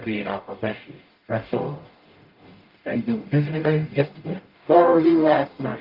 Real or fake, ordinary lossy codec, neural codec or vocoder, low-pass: fake; Opus, 32 kbps; codec, 44.1 kHz, 0.9 kbps, DAC; 5.4 kHz